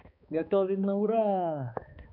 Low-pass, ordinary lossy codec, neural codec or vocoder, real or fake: 5.4 kHz; none; codec, 16 kHz, 2 kbps, X-Codec, HuBERT features, trained on balanced general audio; fake